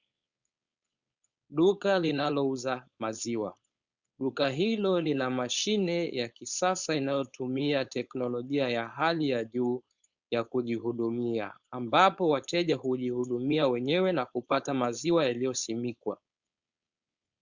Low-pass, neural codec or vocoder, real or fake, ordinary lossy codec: 7.2 kHz; codec, 16 kHz, 4.8 kbps, FACodec; fake; Opus, 64 kbps